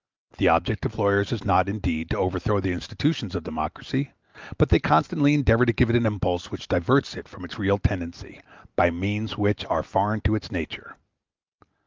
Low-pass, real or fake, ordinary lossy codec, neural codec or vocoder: 7.2 kHz; real; Opus, 32 kbps; none